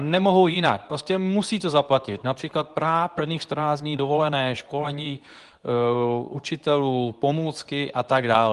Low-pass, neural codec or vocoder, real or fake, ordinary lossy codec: 10.8 kHz; codec, 24 kHz, 0.9 kbps, WavTokenizer, medium speech release version 2; fake; Opus, 24 kbps